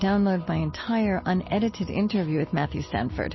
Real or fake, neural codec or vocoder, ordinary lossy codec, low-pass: real; none; MP3, 24 kbps; 7.2 kHz